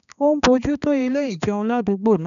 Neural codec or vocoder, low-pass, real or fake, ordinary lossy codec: codec, 16 kHz, 2 kbps, X-Codec, HuBERT features, trained on balanced general audio; 7.2 kHz; fake; none